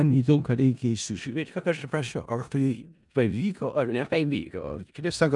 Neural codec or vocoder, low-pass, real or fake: codec, 16 kHz in and 24 kHz out, 0.4 kbps, LongCat-Audio-Codec, four codebook decoder; 10.8 kHz; fake